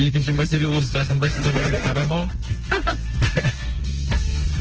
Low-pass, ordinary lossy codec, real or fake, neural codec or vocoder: 7.2 kHz; Opus, 16 kbps; fake; codec, 32 kHz, 1.9 kbps, SNAC